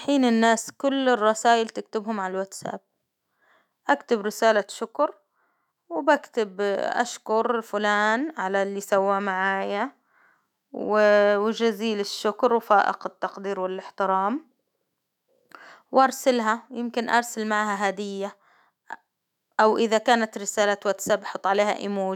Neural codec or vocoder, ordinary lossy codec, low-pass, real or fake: autoencoder, 48 kHz, 128 numbers a frame, DAC-VAE, trained on Japanese speech; none; 19.8 kHz; fake